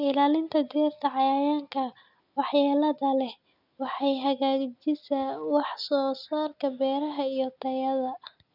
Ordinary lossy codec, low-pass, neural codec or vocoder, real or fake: none; 5.4 kHz; none; real